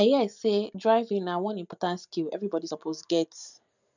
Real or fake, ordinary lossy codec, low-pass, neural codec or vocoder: real; none; 7.2 kHz; none